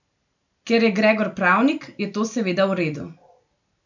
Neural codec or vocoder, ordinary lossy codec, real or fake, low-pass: none; none; real; 7.2 kHz